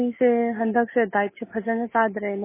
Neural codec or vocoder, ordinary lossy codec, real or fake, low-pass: none; MP3, 16 kbps; real; 3.6 kHz